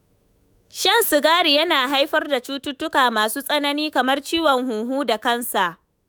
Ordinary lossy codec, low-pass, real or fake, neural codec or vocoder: none; none; fake; autoencoder, 48 kHz, 128 numbers a frame, DAC-VAE, trained on Japanese speech